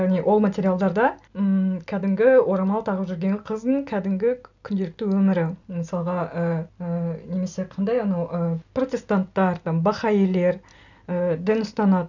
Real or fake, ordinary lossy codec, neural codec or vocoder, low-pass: real; none; none; 7.2 kHz